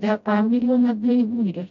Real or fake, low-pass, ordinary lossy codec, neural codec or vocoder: fake; 7.2 kHz; none; codec, 16 kHz, 0.5 kbps, FreqCodec, smaller model